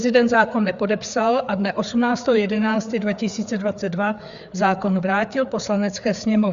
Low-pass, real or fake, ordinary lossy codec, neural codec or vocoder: 7.2 kHz; fake; Opus, 64 kbps; codec, 16 kHz, 4 kbps, FreqCodec, larger model